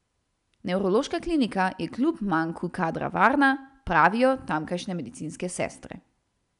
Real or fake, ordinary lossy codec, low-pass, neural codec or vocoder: real; none; 10.8 kHz; none